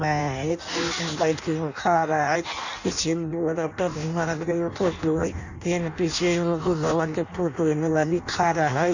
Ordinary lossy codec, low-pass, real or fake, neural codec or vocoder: none; 7.2 kHz; fake; codec, 16 kHz in and 24 kHz out, 0.6 kbps, FireRedTTS-2 codec